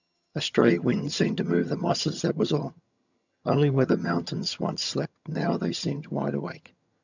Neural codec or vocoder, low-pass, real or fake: vocoder, 22.05 kHz, 80 mel bands, HiFi-GAN; 7.2 kHz; fake